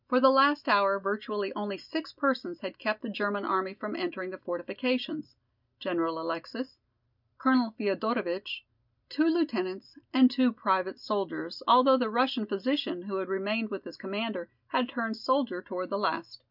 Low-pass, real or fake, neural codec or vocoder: 5.4 kHz; real; none